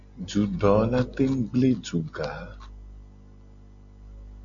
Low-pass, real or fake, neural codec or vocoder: 7.2 kHz; real; none